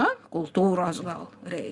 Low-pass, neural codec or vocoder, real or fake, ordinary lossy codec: 10.8 kHz; none; real; Opus, 64 kbps